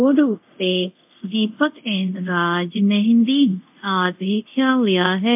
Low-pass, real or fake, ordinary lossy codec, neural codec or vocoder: 3.6 kHz; fake; MP3, 32 kbps; codec, 24 kHz, 0.5 kbps, DualCodec